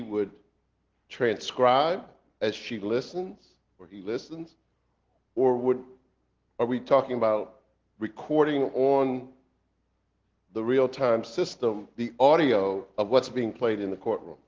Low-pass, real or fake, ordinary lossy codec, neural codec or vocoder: 7.2 kHz; real; Opus, 16 kbps; none